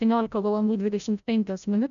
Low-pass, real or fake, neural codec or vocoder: 7.2 kHz; fake; codec, 16 kHz, 0.5 kbps, FreqCodec, larger model